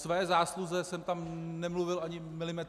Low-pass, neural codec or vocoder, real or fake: 14.4 kHz; none; real